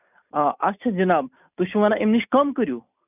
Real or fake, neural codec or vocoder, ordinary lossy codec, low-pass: real; none; none; 3.6 kHz